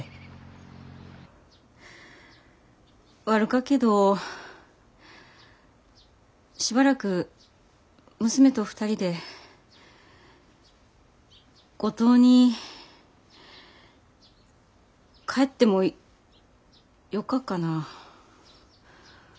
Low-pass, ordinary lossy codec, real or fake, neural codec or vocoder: none; none; real; none